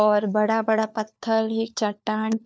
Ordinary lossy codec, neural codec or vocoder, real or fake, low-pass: none; codec, 16 kHz, 4 kbps, FunCodec, trained on LibriTTS, 50 frames a second; fake; none